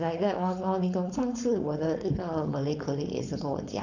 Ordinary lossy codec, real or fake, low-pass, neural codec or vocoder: none; fake; 7.2 kHz; codec, 16 kHz, 4.8 kbps, FACodec